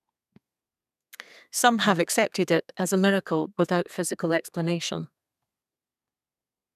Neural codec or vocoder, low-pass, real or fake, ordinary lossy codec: codec, 32 kHz, 1.9 kbps, SNAC; 14.4 kHz; fake; none